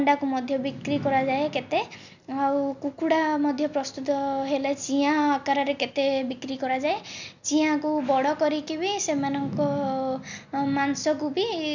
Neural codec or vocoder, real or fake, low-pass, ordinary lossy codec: none; real; 7.2 kHz; none